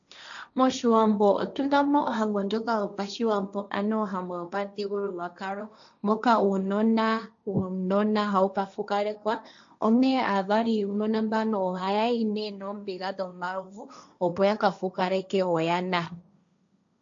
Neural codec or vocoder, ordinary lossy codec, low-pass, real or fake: codec, 16 kHz, 1.1 kbps, Voila-Tokenizer; AAC, 64 kbps; 7.2 kHz; fake